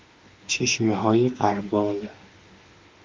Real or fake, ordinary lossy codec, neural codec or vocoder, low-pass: fake; Opus, 32 kbps; autoencoder, 48 kHz, 32 numbers a frame, DAC-VAE, trained on Japanese speech; 7.2 kHz